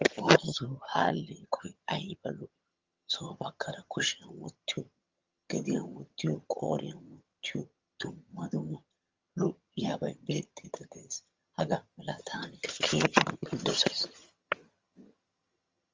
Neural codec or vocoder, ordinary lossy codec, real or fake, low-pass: vocoder, 22.05 kHz, 80 mel bands, HiFi-GAN; Opus, 32 kbps; fake; 7.2 kHz